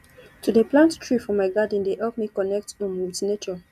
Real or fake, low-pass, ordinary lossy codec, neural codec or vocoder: real; 14.4 kHz; none; none